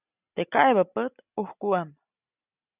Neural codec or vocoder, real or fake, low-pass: none; real; 3.6 kHz